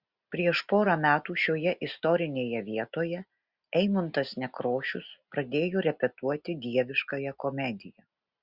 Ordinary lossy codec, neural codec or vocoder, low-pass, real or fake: Opus, 64 kbps; none; 5.4 kHz; real